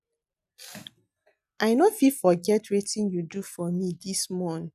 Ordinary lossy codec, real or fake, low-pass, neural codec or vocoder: none; real; 14.4 kHz; none